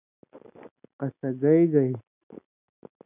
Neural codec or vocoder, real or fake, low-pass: none; real; 3.6 kHz